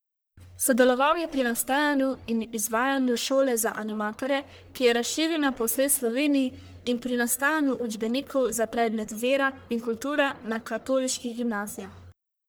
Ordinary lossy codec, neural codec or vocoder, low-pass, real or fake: none; codec, 44.1 kHz, 1.7 kbps, Pupu-Codec; none; fake